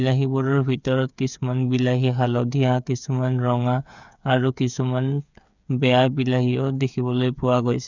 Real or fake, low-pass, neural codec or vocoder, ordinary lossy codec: fake; 7.2 kHz; codec, 16 kHz, 8 kbps, FreqCodec, smaller model; none